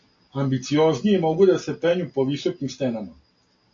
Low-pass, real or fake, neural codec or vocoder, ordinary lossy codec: 7.2 kHz; real; none; AAC, 48 kbps